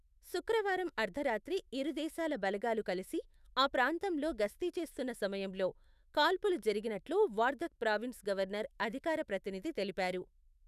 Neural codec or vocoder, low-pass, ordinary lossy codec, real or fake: autoencoder, 48 kHz, 128 numbers a frame, DAC-VAE, trained on Japanese speech; 14.4 kHz; none; fake